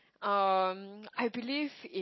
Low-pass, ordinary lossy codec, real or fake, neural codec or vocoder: 7.2 kHz; MP3, 24 kbps; real; none